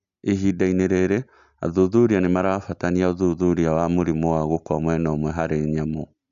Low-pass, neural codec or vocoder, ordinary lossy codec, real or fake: 7.2 kHz; none; AAC, 96 kbps; real